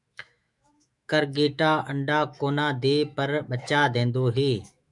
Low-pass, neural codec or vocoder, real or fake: 10.8 kHz; autoencoder, 48 kHz, 128 numbers a frame, DAC-VAE, trained on Japanese speech; fake